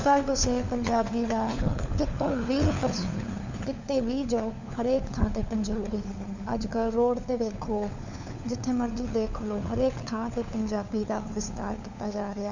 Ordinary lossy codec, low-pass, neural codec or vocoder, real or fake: none; 7.2 kHz; codec, 16 kHz, 4 kbps, FunCodec, trained on LibriTTS, 50 frames a second; fake